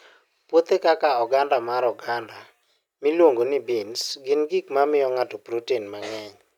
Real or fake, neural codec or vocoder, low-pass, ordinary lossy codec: real; none; 19.8 kHz; none